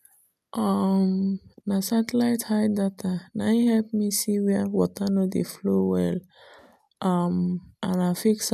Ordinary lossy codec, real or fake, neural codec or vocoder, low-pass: none; real; none; 14.4 kHz